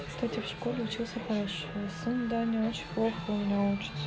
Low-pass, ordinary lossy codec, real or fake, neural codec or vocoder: none; none; real; none